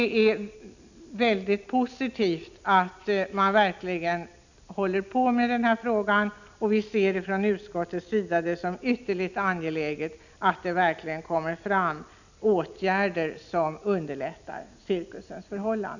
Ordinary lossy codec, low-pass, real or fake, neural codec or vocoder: none; 7.2 kHz; real; none